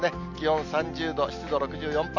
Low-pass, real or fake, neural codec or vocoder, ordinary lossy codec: 7.2 kHz; real; none; none